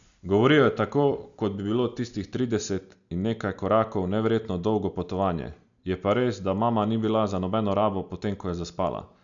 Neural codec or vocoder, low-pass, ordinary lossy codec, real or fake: none; 7.2 kHz; none; real